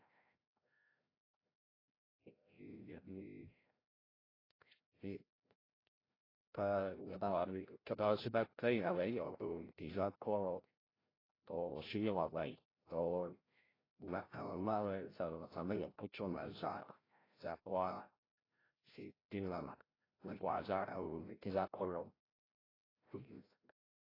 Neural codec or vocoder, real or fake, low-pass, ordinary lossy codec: codec, 16 kHz, 0.5 kbps, FreqCodec, larger model; fake; 5.4 kHz; AAC, 24 kbps